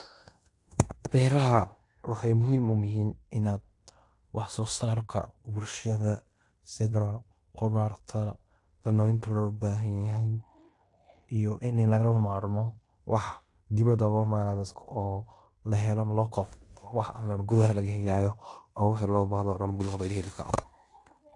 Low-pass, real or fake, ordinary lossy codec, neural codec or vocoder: 10.8 kHz; fake; AAC, 48 kbps; codec, 16 kHz in and 24 kHz out, 0.9 kbps, LongCat-Audio-Codec, fine tuned four codebook decoder